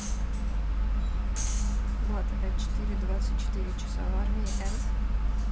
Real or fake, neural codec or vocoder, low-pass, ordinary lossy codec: real; none; none; none